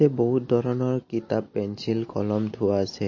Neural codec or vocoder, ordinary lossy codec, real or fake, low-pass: none; MP3, 32 kbps; real; 7.2 kHz